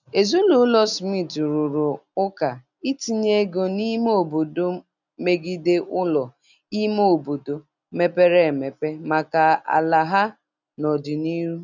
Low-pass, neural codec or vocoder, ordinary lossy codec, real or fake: 7.2 kHz; none; none; real